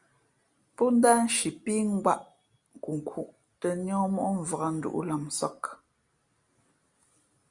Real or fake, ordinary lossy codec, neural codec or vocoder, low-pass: real; Opus, 64 kbps; none; 10.8 kHz